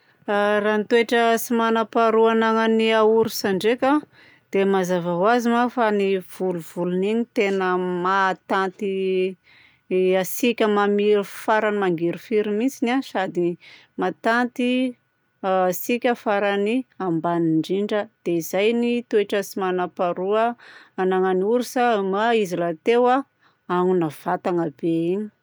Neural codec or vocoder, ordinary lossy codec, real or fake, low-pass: none; none; real; none